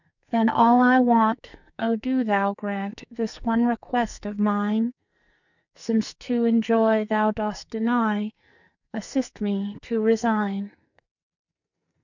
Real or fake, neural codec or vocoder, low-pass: fake; codec, 44.1 kHz, 2.6 kbps, SNAC; 7.2 kHz